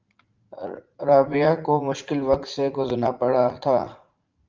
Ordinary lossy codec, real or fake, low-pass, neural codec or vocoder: Opus, 24 kbps; fake; 7.2 kHz; vocoder, 44.1 kHz, 80 mel bands, Vocos